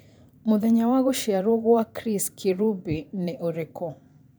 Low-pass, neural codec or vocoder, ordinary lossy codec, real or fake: none; vocoder, 44.1 kHz, 128 mel bands every 512 samples, BigVGAN v2; none; fake